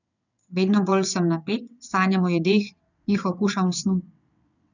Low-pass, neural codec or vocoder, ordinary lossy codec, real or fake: 7.2 kHz; vocoder, 22.05 kHz, 80 mel bands, WaveNeXt; none; fake